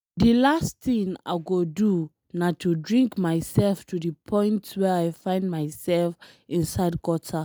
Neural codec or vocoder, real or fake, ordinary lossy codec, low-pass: none; real; none; none